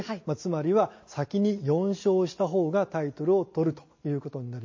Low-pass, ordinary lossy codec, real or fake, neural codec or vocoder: 7.2 kHz; MP3, 32 kbps; real; none